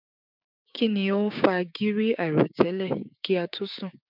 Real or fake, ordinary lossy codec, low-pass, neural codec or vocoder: fake; none; 5.4 kHz; codec, 16 kHz, 6 kbps, DAC